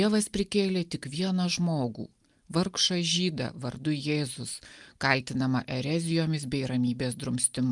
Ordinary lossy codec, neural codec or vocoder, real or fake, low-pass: Opus, 32 kbps; none; real; 10.8 kHz